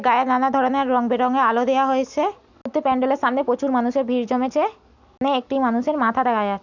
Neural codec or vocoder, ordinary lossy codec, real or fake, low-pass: none; none; real; 7.2 kHz